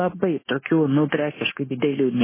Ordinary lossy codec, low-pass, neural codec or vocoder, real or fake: MP3, 16 kbps; 3.6 kHz; codec, 16 kHz, 0.9 kbps, LongCat-Audio-Codec; fake